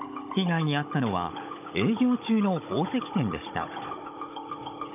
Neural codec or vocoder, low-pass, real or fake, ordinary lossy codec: codec, 16 kHz, 16 kbps, FunCodec, trained on Chinese and English, 50 frames a second; 3.6 kHz; fake; none